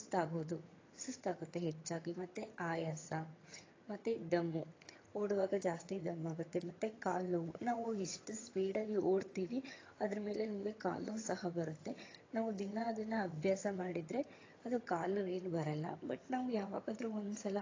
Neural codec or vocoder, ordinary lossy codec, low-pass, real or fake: vocoder, 22.05 kHz, 80 mel bands, HiFi-GAN; AAC, 32 kbps; 7.2 kHz; fake